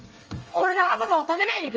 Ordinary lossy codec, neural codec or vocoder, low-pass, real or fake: Opus, 24 kbps; codec, 24 kHz, 1 kbps, SNAC; 7.2 kHz; fake